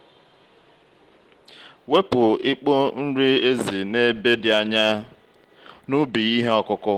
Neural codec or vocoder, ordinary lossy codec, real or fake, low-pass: none; Opus, 16 kbps; real; 19.8 kHz